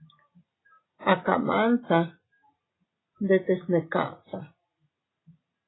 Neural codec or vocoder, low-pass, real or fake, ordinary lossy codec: none; 7.2 kHz; real; AAC, 16 kbps